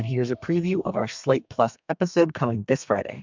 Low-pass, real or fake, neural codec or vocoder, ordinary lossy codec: 7.2 kHz; fake; codec, 32 kHz, 1.9 kbps, SNAC; MP3, 64 kbps